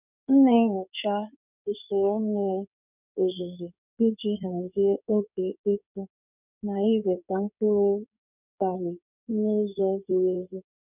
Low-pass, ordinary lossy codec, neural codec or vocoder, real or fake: 3.6 kHz; none; codec, 16 kHz in and 24 kHz out, 2.2 kbps, FireRedTTS-2 codec; fake